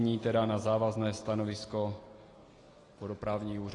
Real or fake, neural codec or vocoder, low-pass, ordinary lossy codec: real; none; 10.8 kHz; AAC, 32 kbps